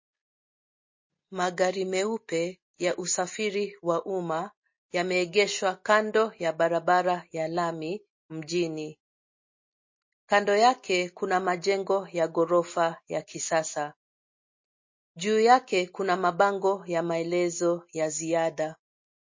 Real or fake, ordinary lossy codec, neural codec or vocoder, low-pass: real; MP3, 32 kbps; none; 7.2 kHz